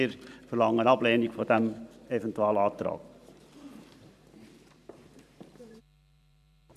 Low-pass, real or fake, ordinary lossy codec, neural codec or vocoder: 14.4 kHz; fake; none; vocoder, 44.1 kHz, 128 mel bands every 512 samples, BigVGAN v2